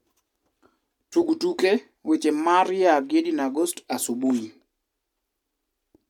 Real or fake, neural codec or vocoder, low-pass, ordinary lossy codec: real; none; 19.8 kHz; none